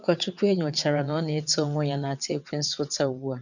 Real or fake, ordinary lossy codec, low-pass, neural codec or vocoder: fake; none; 7.2 kHz; vocoder, 22.05 kHz, 80 mel bands, WaveNeXt